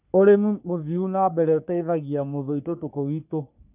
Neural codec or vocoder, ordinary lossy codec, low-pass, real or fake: codec, 44.1 kHz, 3.4 kbps, Pupu-Codec; none; 3.6 kHz; fake